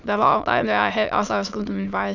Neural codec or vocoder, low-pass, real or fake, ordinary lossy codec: autoencoder, 22.05 kHz, a latent of 192 numbers a frame, VITS, trained on many speakers; 7.2 kHz; fake; none